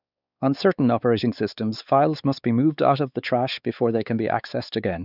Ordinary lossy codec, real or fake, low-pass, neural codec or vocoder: none; fake; 5.4 kHz; codec, 16 kHz, 4 kbps, X-Codec, WavLM features, trained on Multilingual LibriSpeech